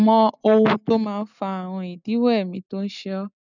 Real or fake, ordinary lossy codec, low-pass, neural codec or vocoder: real; none; 7.2 kHz; none